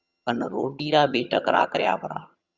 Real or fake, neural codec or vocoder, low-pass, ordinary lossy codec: fake; vocoder, 22.05 kHz, 80 mel bands, HiFi-GAN; 7.2 kHz; Opus, 64 kbps